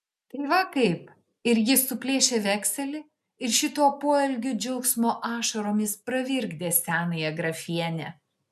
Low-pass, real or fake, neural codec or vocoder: 14.4 kHz; real; none